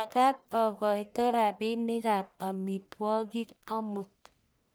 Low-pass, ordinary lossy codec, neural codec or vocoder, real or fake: none; none; codec, 44.1 kHz, 1.7 kbps, Pupu-Codec; fake